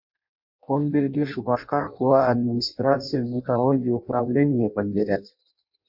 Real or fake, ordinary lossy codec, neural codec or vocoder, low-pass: fake; MP3, 48 kbps; codec, 16 kHz in and 24 kHz out, 0.6 kbps, FireRedTTS-2 codec; 5.4 kHz